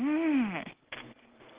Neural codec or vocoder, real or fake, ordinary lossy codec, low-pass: none; real; Opus, 16 kbps; 3.6 kHz